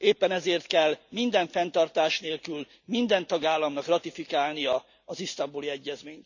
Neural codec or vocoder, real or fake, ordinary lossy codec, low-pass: none; real; none; 7.2 kHz